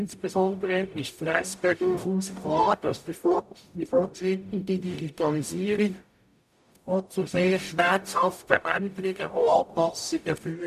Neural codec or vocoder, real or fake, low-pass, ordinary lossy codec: codec, 44.1 kHz, 0.9 kbps, DAC; fake; 14.4 kHz; none